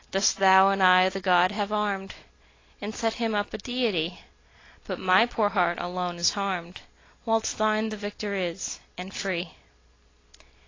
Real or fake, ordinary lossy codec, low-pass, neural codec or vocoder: real; AAC, 32 kbps; 7.2 kHz; none